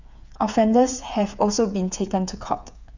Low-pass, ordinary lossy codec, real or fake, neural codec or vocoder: 7.2 kHz; none; fake; codec, 16 kHz, 8 kbps, FreqCodec, smaller model